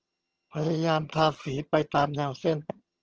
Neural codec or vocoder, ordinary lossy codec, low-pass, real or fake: vocoder, 22.05 kHz, 80 mel bands, HiFi-GAN; Opus, 16 kbps; 7.2 kHz; fake